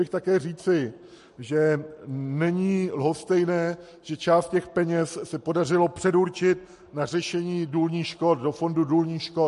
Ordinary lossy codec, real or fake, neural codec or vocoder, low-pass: MP3, 48 kbps; real; none; 14.4 kHz